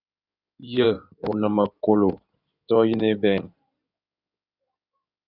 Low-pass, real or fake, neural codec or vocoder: 5.4 kHz; fake; codec, 16 kHz in and 24 kHz out, 2.2 kbps, FireRedTTS-2 codec